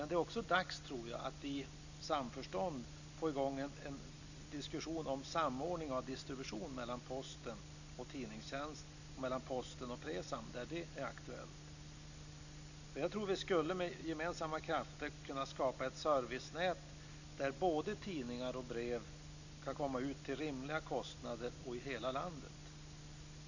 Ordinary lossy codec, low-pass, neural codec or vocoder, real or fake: none; 7.2 kHz; none; real